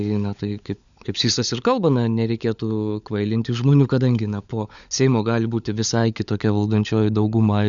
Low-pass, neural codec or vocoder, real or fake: 7.2 kHz; codec, 16 kHz, 16 kbps, FunCodec, trained on LibriTTS, 50 frames a second; fake